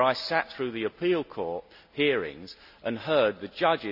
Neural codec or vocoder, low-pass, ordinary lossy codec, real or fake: none; 5.4 kHz; none; real